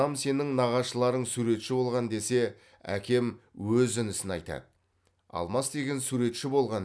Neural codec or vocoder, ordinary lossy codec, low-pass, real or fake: none; none; none; real